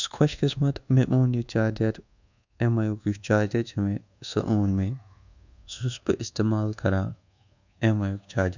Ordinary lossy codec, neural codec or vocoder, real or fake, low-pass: none; codec, 24 kHz, 1.2 kbps, DualCodec; fake; 7.2 kHz